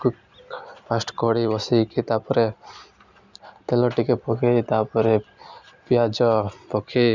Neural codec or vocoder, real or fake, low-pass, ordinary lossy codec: none; real; 7.2 kHz; none